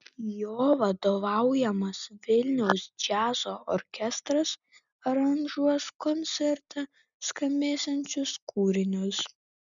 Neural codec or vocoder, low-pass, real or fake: none; 7.2 kHz; real